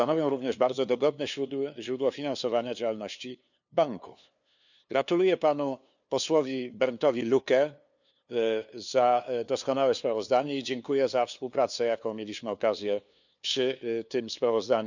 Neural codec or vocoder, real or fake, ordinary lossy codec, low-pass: codec, 16 kHz, 2 kbps, FunCodec, trained on LibriTTS, 25 frames a second; fake; none; 7.2 kHz